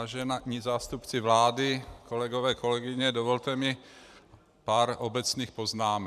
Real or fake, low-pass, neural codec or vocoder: fake; 14.4 kHz; vocoder, 44.1 kHz, 128 mel bands every 512 samples, BigVGAN v2